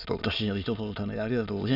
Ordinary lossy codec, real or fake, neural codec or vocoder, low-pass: none; fake; autoencoder, 22.05 kHz, a latent of 192 numbers a frame, VITS, trained on many speakers; 5.4 kHz